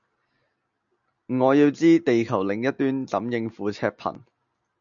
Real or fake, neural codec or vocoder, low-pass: real; none; 7.2 kHz